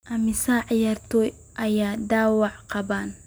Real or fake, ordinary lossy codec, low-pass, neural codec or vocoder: real; none; none; none